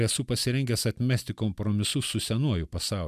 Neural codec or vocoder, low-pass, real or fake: none; 14.4 kHz; real